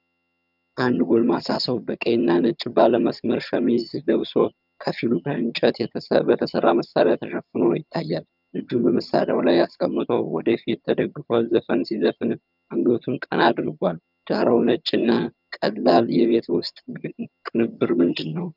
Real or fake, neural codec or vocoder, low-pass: fake; vocoder, 22.05 kHz, 80 mel bands, HiFi-GAN; 5.4 kHz